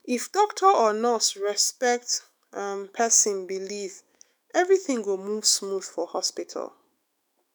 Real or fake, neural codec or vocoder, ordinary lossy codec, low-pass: fake; autoencoder, 48 kHz, 128 numbers a frame, DAC-VAE, trained on Japanese speech; none; none